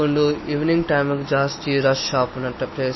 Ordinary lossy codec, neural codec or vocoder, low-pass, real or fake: MP3, 24 kbps; none; 7.2 kHz; real